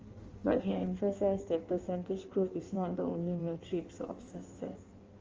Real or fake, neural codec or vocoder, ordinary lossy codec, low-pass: fake; codec, 16 kHz in and 24 kHz out, 1.1 kbps, FireRedTTS-2 codec; Opus, 32 kbps; 7.2 kHz